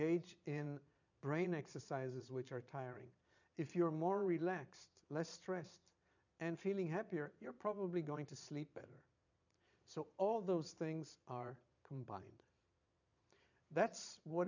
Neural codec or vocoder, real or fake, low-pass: vocoder, 22.05 kHz, 80 mel bands, Vocos; fake; 7.2 kHz